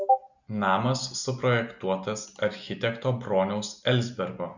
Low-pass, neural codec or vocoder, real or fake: 7.2 kHz; none; real